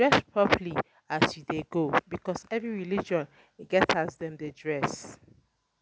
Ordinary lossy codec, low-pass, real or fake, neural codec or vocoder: none; none; real; none